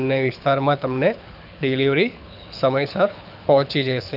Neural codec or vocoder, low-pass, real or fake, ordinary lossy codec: codec, 24 kHz, 6 kbps, HILCodec; 5.4 kHz; fake; none